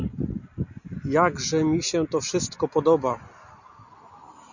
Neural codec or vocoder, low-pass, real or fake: none; 7.2 kHz; real